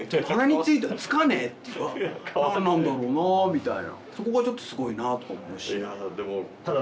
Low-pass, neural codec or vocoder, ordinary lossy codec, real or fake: none; none; none; real